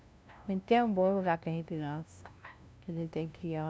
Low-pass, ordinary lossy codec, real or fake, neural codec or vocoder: none; none; fake; codec, 16 kHz, 0.5 kbps, FunCodec, trained on LibriTTS, 25 frames a second